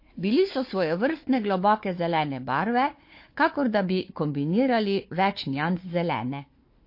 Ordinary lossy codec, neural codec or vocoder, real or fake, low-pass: MP3, 32 kbps; codec, 16 kHz, 4 kbps, FunCodec, trained on Chinese and English, 50 frames a second; fake; 5.4 kHz